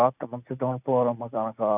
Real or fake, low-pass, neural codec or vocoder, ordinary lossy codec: real; 3.6 kHz; none; none